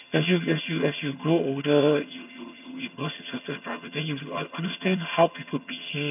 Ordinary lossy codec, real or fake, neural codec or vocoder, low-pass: none; fake; vocoder, 22.05 kHz, 80 mel bands, HiFi-GAN; 3.6 kHz